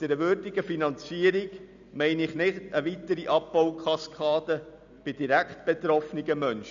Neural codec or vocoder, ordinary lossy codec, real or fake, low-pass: none; none; real; 7.2 kHz